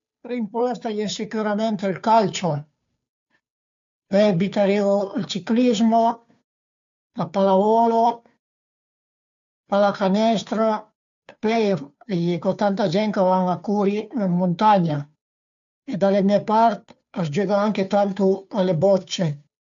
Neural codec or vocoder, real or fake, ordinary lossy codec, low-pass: codec, 16 kHz, 2 kbps, FunCodec, trained on Chinese and English, 25 frames a second; fake; MP3, 64 kbps; 7.2 kHz